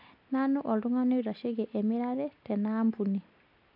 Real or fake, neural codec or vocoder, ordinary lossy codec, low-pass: real; none; MP3, 48 kbps; 5.4 kHz